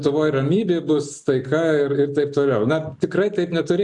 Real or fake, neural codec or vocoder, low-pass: real; none; 10.8 kHz